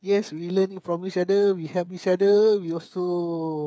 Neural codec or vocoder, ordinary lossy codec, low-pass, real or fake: codec, 16 kHz, 8 kbps, FreqCodec, smaller model; none; none; fake